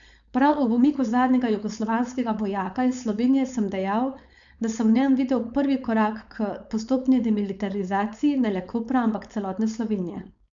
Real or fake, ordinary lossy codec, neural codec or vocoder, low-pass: fake; MP3, 96 kbps; codec, 16 kHz, 4.8 kbps, FACodec; 7.2 kHz